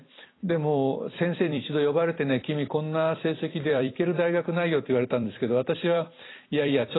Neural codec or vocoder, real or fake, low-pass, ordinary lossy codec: vocoder, 44.1 kHz, 128 mel bands every 256 samples, BigVGAN v2; fake; 7.2 kHz; AAC, 16 kbps